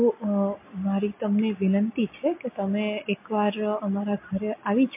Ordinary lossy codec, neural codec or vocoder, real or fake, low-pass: MP3, 24 kbps; none; real; 3.6 kHz